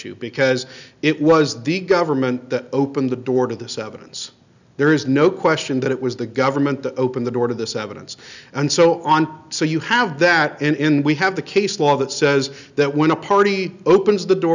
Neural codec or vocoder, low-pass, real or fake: none; 7.2 kHz; real